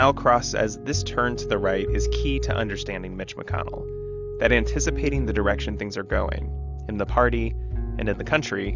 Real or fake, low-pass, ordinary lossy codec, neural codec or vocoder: real; 7.2 kHz; Opus, 64 kbps; none